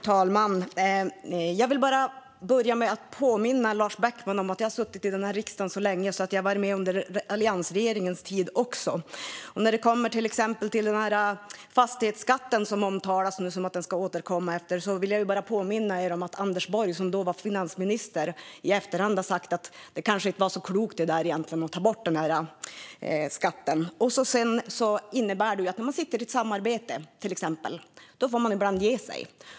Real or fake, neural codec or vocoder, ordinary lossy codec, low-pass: real; none; none; none